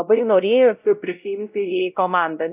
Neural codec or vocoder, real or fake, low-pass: codec, 16 kHz, 0.5 kbps, X-Codec, WavLM features, trained on Multilingual LibriSpeech; fake; 3.6 kHz